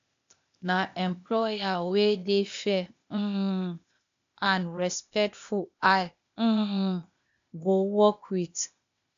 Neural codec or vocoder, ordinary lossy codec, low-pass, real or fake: codec, 16 kHz, 0.8 kbps, ZipCodec; AAC, 64 kbps; 7.2 kHz; fake